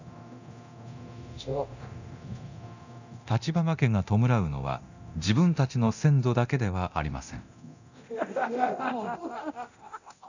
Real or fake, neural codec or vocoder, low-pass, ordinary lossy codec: fake; codec, 24 kHz, 0.9 kbps, DualCodec; 7.2 kHz; none